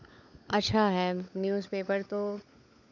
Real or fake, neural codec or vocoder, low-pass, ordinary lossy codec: fake; codec, 16 kHz, 16 kbps, FunCodec, trained on LibriTTS, 50 frames a second; 7.2 kHz; none